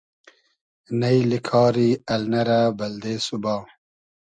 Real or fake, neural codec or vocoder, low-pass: real; none; 9.9 kHz